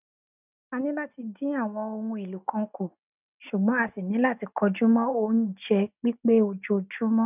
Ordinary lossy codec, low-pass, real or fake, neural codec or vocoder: none; 3.6 kHz; real; none